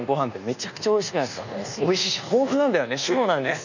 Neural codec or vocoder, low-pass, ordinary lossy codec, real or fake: codec, 24 kHz, 1.2 kbps, DualCodec; 7.2 kHz; none; fake